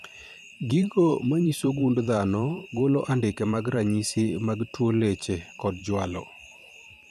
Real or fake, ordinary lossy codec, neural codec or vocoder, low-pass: fake; none; vocoder, 44.1 kHz, 128 mel bands every 512 samples, BigVGAN v2; 14.4 kHz